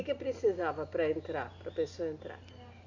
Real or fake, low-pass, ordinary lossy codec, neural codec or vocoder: real; 7.2 kHz; none; none